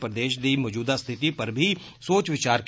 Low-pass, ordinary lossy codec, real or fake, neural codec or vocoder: none; none; real; none